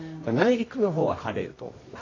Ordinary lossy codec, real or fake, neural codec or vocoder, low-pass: AAC, 32 kbps; fake; codec, 24 kHz, 0.9 kbps, WavTokenizer, medium music audio release; 7.2 kHz